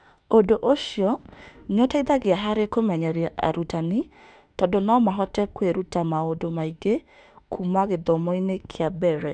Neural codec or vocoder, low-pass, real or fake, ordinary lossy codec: autoencoder, 48 kHz, 32 numbers a frame, DAC-VAE, trained on Japanese speech; 9.9 kHz; fake; none